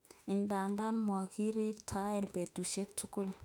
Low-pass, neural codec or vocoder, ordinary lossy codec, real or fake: 19.8 kHz; autoencoder, 48 kHz, 32 numbers a frame, DAC-VAE, trained on Japanese speech; none; fake